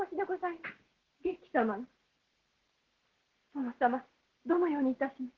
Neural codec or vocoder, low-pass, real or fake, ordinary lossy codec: none; 7.2 kHz; real; Opus, 16 kbps